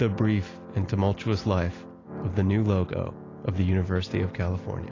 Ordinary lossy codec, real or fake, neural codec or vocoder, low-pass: AAC, 32 kbps; real; none; 7.2 kHz